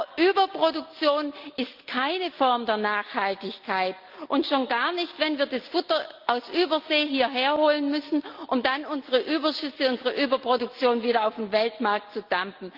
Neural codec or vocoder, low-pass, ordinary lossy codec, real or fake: none; 5.4 kHz; Opus, 32 kbps; real